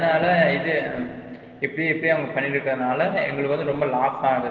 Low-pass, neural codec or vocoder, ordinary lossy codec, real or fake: 7.2 kHz; none; Opus, 16 kbps; real